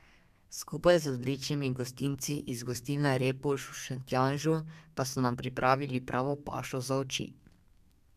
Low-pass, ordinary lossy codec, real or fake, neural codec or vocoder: 14.4 kHz; none; fake; codec, 32 kHz, 1.9 kbps, SNAC